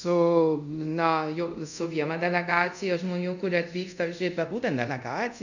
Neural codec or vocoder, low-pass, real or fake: codec, 24 kHz, 0.5 kbps, DualCodec; 7.2 kHz; fake